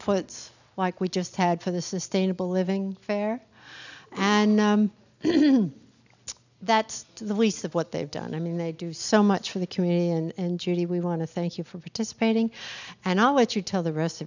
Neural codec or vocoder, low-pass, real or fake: none; 7.2 kHz; real